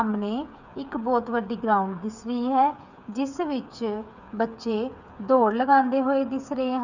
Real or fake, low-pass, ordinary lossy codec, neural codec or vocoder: fake; 7.2 kHz; none; codec, 16 kHz, 8 kbps, FreqCodec, smaller model